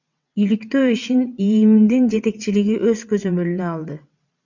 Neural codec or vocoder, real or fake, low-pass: vocoder, 22.05 kHz, 80 mel bands, WaveNeXt; fake; 7.2 kHz